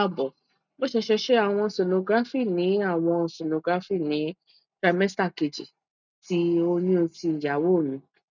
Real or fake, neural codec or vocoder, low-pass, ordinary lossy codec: real; none; 7.2 kHz; none